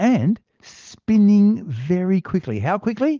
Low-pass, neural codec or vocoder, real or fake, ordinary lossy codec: 7.2 kHz; none; real; Opus, 24 kbps